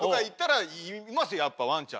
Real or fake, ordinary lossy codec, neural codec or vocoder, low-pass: real; none; none; none